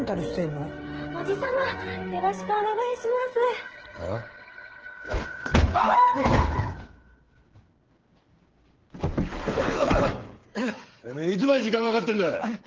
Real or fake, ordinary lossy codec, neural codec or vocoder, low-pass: fake; Opus, 16 kbps; codec, 16 kHz, 8 kbps, FreqCodec, smaller model; 7.2 kHz